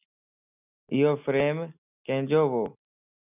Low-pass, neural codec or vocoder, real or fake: 3.6 kHz; none; real